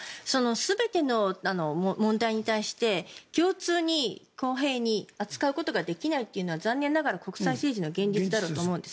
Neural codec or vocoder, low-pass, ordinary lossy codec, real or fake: none; none; none; real